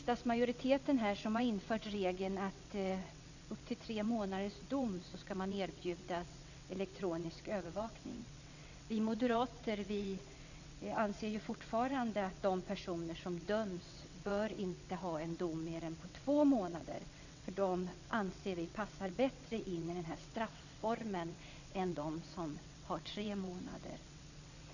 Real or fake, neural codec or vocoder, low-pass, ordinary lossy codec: fake; vocoder, 22.05 kHz, 80 mel bands, WaveNeXt; 7.2 kHz; none